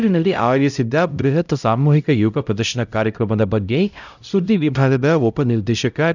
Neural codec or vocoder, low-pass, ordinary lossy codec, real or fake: codec, 16 kHz, 0.5 kbps, X-Codec, HuBERT features, trained on LibriSpeech; 7.2 kHz; none; fake